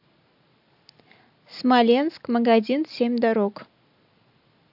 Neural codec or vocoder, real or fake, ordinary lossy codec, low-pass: none; real; AAC, 48 kbps; 5.4 kHz